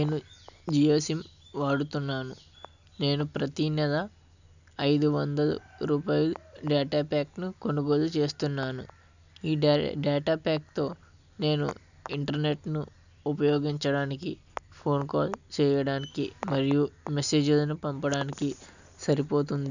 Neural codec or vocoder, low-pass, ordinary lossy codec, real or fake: none; 7.2 kHz; none; real